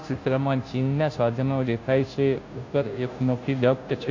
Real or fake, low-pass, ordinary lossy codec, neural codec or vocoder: fake; 7.2 kHz; AAC, 48 kbps; codec, 16 kHz, 0.5 kbps, FunCodec, trained on Chinese and English, 25 frames a second